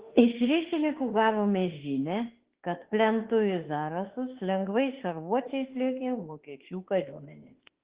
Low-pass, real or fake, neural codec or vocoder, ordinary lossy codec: 3.6 kHz; fake; autoencoder, 48 kHz, 32 numbers a frame, DAC-VAE, trained on Japanese speech; Opus, 16 kbps